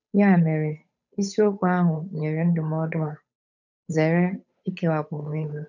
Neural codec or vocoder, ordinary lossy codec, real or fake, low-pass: codec, 16 kHz, 8 kbps, FunCodec, trained on Chinese and English, 25 frames a second; none; fake; 7.2 kHz